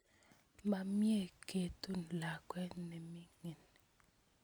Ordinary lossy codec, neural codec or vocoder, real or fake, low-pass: none; none; real; none